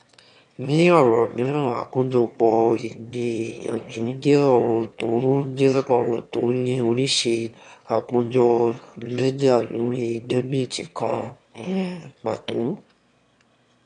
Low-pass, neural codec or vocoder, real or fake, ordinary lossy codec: 9.9 kHz; autoencoder, 22.05 kHz, a latent of 192 numbers a frame, VITS, trained on one speaker; fake; none